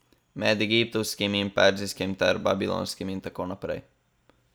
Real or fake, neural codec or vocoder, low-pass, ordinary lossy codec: real; none; none; none